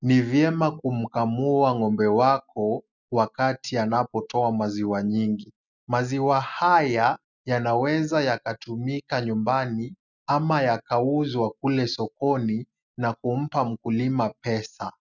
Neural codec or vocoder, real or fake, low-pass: none; real; 7.2 kHz